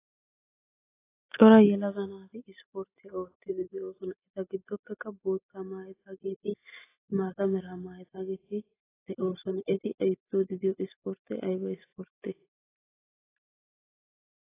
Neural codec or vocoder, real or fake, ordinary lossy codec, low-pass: none; real; AAC, 16 kbps; 3.6 kHz